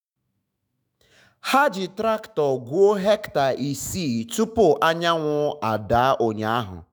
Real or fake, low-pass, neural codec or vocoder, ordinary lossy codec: fake; none; autoencoder, 48 kHz, 128 numbers a frame, DAC-VAE, trained on Japanese speech; none